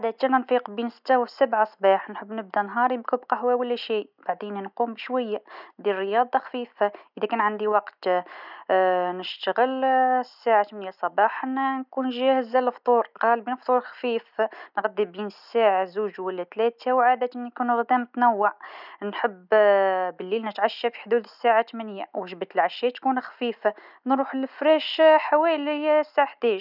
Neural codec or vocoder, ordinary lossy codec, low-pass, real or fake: none; none; 5.4 kHz; real